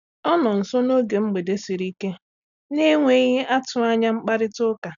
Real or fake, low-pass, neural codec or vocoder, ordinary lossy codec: real; 7.2 kHz; none; none